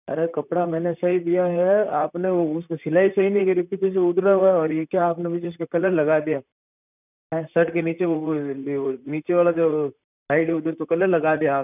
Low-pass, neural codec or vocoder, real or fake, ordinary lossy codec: 3.6 kHz; vocoder, 44.1 kHz, 128 mel bands, Pupu-Vocoder; fake; none